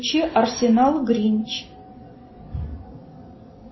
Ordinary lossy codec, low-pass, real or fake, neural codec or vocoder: MP3, 24 kbps; 7.2 kHz; real; none